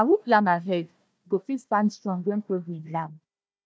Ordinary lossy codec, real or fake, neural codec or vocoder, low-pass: none; fake; codec, 16 kHz, 1 kbps, FunCodec, trained on Chinese and English, 50 frames a second; none